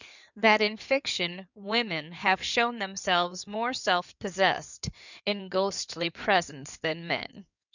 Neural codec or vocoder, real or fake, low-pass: codec, 16 kHz in and 24 kHz out, 2.2 kbps, FireRedTTS-2 codec; fake; 7.2 kHz